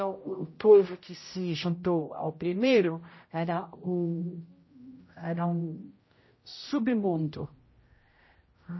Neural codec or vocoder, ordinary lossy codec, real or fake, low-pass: codec, 16 kHz, 0.5 kbps, X-Codec, HuBERT features, trained on general audio; MP3, 24 kbps; fake; 7.2 kHz